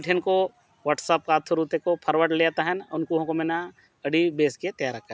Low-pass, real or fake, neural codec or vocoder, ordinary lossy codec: none; real; none; none